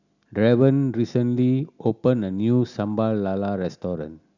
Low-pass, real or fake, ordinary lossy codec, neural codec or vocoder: 7.2 kHz; real; none; none